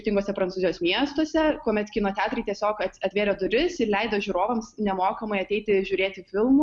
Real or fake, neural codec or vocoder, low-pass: real; none; 10.8 kHz